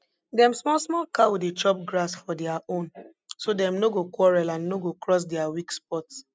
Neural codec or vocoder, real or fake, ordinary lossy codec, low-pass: none; real; none; none